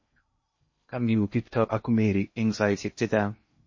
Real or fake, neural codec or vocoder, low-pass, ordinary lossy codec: fake; codec, 16 kHz in and 24 kHz out, 0.6 kbps, FocalCodec, streaming, 2048 codes; 7.2 kHz; MP3, 32 kbps